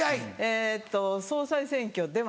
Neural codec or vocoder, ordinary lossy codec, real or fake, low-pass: none; none; real; none